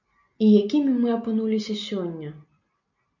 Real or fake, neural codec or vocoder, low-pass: real; none; 7.2 kHz